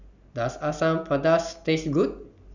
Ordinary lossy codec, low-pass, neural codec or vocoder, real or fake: none; 7.2 kHz; none; real